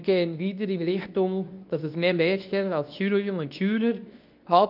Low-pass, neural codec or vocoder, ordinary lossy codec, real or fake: 5.4 kHz; codec, 24 kHz, 0.9 kbps, WavTokenizer, medium speech release version 2; none; fake